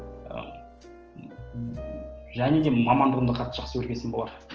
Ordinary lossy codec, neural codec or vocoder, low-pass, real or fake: Opus, 16 kbps; none; 7.2 kHz; real